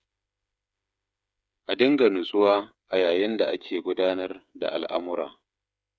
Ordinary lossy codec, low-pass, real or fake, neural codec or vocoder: none; none; fake; codec, 16 kHz, 8 kbps, FreqCodec, smaller model